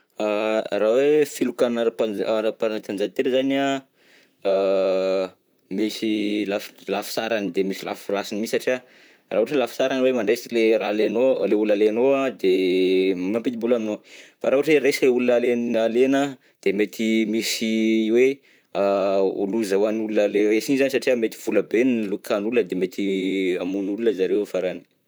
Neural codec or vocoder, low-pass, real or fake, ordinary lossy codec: vocoder, 44.1 kHz, 128 mel bands, Pupu-Vocoder; none; fake; none